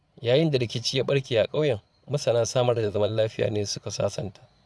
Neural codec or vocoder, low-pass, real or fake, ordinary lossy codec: vocoder, 22.05 kHz, 80 mel bands, Vocos; none; fake; none